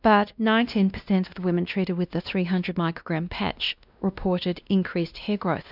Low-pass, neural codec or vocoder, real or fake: 5.4 kHz; codec, 16 kHz, 1 kbps, X-Codec, WavLM features, trained on Multilingual LibriSpeech; fake